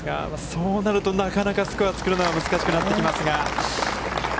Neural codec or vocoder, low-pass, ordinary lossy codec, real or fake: none; none; none; real